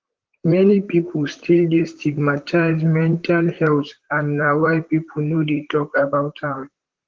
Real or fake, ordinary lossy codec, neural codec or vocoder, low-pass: fake; Opus, 16 kbps; vocoder, 44.1 kHz, 128 mel bands, Pupu-Vocoder; 7.2 kHz